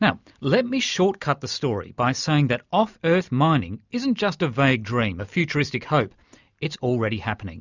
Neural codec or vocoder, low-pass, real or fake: none; 7.2 kHz; real